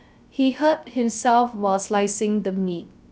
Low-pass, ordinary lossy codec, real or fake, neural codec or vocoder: none; none; fake; codec, 16 kHz, 0.3 kbps, FocalCodec